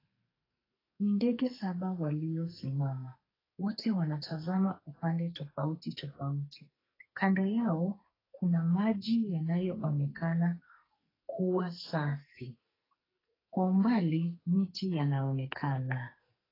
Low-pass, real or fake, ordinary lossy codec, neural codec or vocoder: 5.4 kHz; fake; AAC, 24 kbps; codec, 44.1 kHz, 2.6 kbps, SNAC